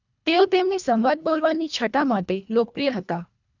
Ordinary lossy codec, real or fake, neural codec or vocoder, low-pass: none; fake; codec, 24 kHz, 1.5 kbps, HILCodec; 7.2 kHz